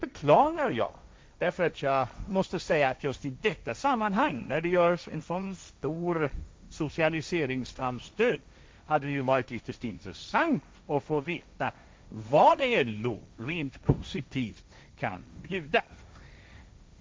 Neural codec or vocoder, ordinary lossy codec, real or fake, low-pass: codec, 16 kHz, 1.1 kbps, Voila-Tokenizer; none; fake; none